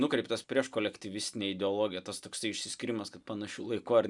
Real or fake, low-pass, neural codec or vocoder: real; 10.8 kHz; none